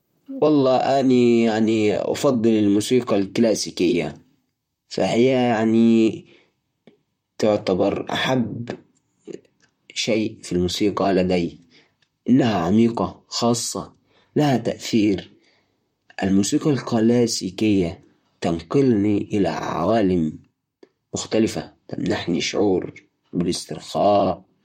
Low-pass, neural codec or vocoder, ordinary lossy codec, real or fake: 19.8 kHz; vocoder, 44.1 kHz, 128 mel bands, Pupu-Vocoder; MP3, 64 kbps; fake